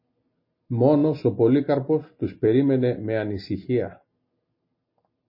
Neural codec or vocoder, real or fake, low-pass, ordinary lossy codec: none; real; 5.4 kHz; MP3, 24 kbps